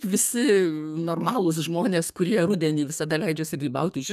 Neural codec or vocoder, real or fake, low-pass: codec, 32 kHz, 1.9 kbps, SNAC; fake; 14.4 kHz